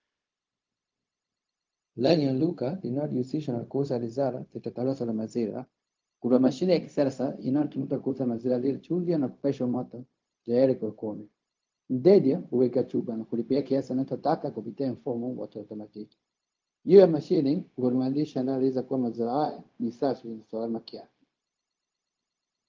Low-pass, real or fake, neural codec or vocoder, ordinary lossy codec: 7.2 kHz; fake; codec, 16 kHz, 0.4 kbps, LongCat-Audio-Codec; Opus, 24 kbps